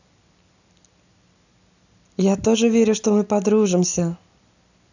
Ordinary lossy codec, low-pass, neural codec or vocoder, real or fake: none; 7.2 kHz; none; real